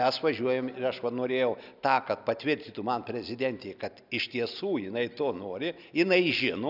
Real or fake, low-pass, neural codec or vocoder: real; 5.4 kHz; none